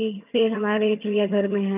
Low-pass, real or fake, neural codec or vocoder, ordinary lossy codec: 3.6 kHz; fake; vocoder, 22.05 kHz, 80 mel bands, HiFi-GAN; none